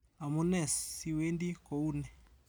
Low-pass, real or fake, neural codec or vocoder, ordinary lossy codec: none; real; none; none